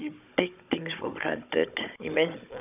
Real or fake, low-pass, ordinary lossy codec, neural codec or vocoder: fake; 3.6 kHz; none; codec, 16 kHz, 16 kbps, FunCodec, trained on Chinese and English, 50 frames a second